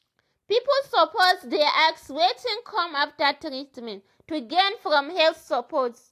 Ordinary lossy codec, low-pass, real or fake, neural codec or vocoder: AAC, 64 kbps; 14.4 kHz; real; none